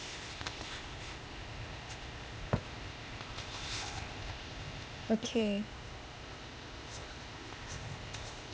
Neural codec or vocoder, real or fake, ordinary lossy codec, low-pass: codec, 16 kHz, 0.8 kbps, ZipCodec; fake; none; none